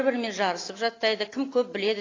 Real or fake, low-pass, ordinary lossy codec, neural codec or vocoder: real; 7.2 kHz; AAC, 32 kbps; none